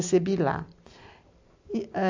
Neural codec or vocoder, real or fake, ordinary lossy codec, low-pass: none; real; AAC, 48 kbps; 7.2 kHz